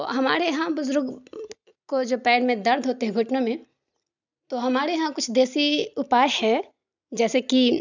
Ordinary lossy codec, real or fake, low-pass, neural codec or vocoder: none; real; 7.2 kHz; none